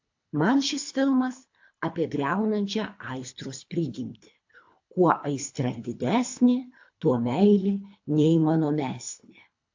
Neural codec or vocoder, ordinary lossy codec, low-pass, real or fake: codec, 24 kHz, 3 kbps, HILCodec; AAC, 48 kbps; 7.2 kHz; fake